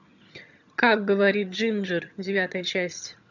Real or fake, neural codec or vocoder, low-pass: fake; vocoder, 22.05 kHz, 80 mel bands, HiFi-GAN; 7.2 kHz